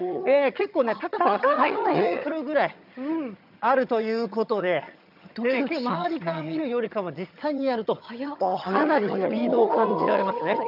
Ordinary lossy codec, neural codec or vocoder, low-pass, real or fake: none; vocoder, 22.05 kHz, 80 mel bands, HiFi-GAN; 5.4 kHz; fake